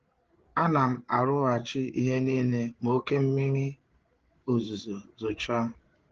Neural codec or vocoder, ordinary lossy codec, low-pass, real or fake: codec, 16 kHz, 8 kbps, FreqCodec, larger model; Opus, 16 kbps; 7.2 kHz; fake